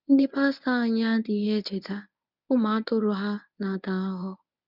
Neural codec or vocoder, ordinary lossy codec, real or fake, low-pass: codec, 24 kHz, 0.9 kbps, WavTokenizer, medium speech release version 1; none; fake; 5.4 kHz